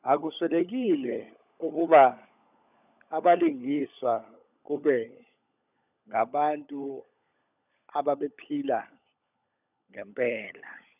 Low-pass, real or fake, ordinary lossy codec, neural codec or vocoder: 3.6 kHz; fake; none; codec, 16 kHz, 16 kbps, FunCodec, trained on LibriTTS, 50 frames a second